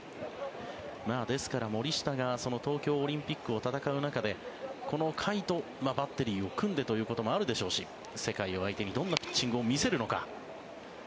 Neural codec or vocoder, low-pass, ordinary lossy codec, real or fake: none; none; none; real